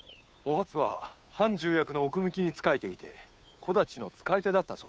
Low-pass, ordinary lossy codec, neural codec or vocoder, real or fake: none; none; codec, 16 kHz, 2 kbps, FunCodec, trained on Chinese and English, 25 frames a second; fake